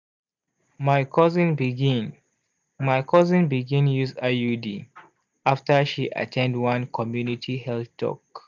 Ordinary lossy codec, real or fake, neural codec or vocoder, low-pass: none; real; none; 7.2 kHz